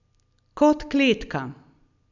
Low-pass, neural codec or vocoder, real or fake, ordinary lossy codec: 7.2 kHz; none; real; none